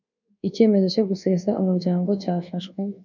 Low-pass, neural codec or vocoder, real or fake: 7.2 kHz; codec, 24 kHz, 1.2 kbps, DualCodec; fake